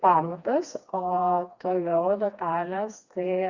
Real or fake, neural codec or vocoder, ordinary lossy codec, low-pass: fake; codec, 16 kHz, 2 kbps, FreqCodec, smaller model; Opus, 64 kbps; 7.2 kHz